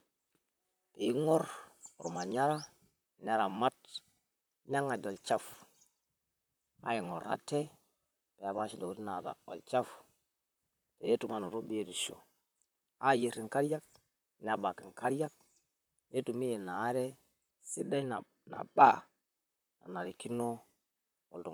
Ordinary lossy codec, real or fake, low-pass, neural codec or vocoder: none; fake; none; vocoder, 44.1 kHz, 128 mel bands, Pupu-Vocoder